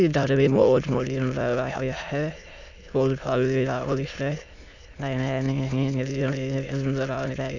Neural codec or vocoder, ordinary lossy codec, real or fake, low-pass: autoencoder, 22.05 kHz, a latent of 192 numbers a frame, VITS, trained on many speakers; none; fake; 7.2 kHz